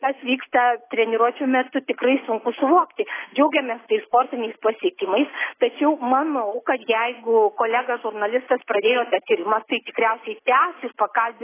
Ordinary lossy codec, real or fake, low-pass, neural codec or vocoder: AAC, 16 kbps; real; 3.6 kHz; none